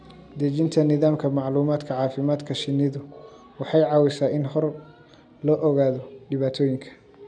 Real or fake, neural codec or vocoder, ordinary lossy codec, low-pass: real; none; none; 9.9 kHz